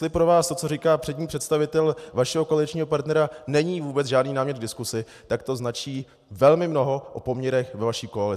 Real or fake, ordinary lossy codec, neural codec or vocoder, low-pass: real; AAC, 96 kbps; none; 14.4 kHz